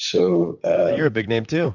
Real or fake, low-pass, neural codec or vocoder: fake; 7.2 kHz; vocoder, 44.1 kHz, 128 mel bands, Pupu-Vocoder